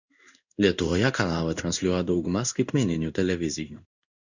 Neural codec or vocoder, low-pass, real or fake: codec, 16 kHz in and 24 kHz out, 1 kbps, XY-Tokenizer; 7.2 kHz; fake